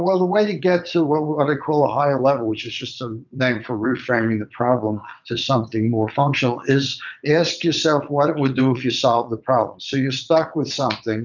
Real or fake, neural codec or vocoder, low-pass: fake; vocoder, 22.05 kHz, 80 mel bands, WaveNeXt; 7.2 kHz